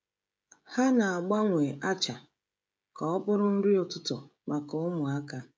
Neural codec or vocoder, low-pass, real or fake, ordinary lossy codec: codec, 16 kHz, 16 kbps, FreqCodec, smaller model; none; fake; none